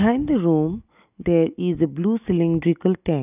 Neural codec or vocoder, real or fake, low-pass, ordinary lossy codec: none; real; 3.6 kHz; none